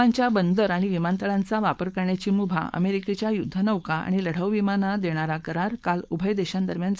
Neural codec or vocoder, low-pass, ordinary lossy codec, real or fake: codec, 16 kHz, 4.8 kbps, FACodec; none; none; fake